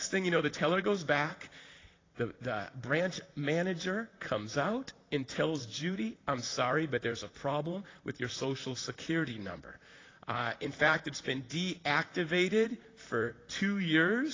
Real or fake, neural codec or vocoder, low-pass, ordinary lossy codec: fake; vocoder, 44.1 kHz, 128 mel bands, Pupu-Vocoder; 7.2 kHz; AAC, 32 kbps